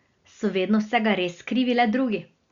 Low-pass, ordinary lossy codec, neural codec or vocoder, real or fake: 7.2 kHz; Opus, 64 kbps; none; real